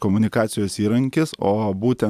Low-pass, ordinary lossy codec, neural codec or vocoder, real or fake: 14.4 kHz; AAC, 96 kbps; none; real